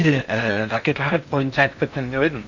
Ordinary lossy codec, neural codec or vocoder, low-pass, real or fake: none; codec, 16 kHz in and 24 kHz out, 0.8 kbps, FocalCodec, streaming, 65536 codes; 7.2 kHz; fake